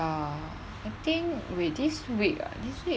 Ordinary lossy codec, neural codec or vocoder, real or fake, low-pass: none; none; real; none